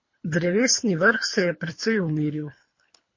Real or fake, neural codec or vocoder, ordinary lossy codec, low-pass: fake; codec, 24 kHz, 3 kbps, HILCodec; MP3, 32 kbps; 7.2 kHz